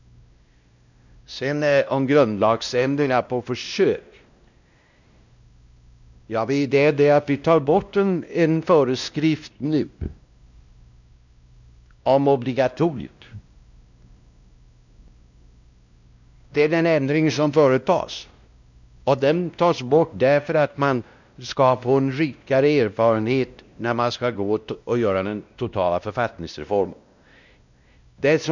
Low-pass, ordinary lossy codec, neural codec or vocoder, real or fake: 7.2 kHz; none; codec, 16 kHz, 1 kbps, X-Codec, WavLM features, trained on Multilingual LibriSpeech; fake